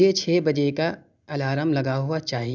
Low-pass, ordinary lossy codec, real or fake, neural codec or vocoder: 7.2 kHz; none; real; none